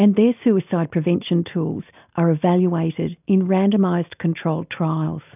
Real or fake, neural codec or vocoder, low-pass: real; none; 3.6 kHz